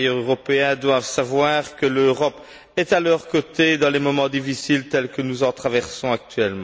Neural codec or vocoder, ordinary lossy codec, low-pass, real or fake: none; none; none; real